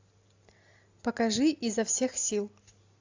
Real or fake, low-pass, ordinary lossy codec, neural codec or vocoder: real; 7.2 kHz; AAC, 48 kbps; none